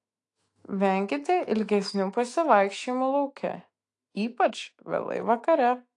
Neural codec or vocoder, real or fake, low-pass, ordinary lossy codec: autoencoder, 48 kHz, 128 numbers a frame, DAC-VAE, trained on Japanese speech; fake; 10.8 kHz; AAC, 48 kbps